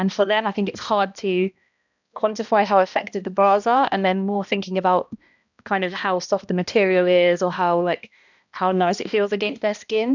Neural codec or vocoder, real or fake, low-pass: codec, 16 kHz, 1 kbps, X-Codec, HuBERT features, trained on balanced general audio; fake; 7.2 kHz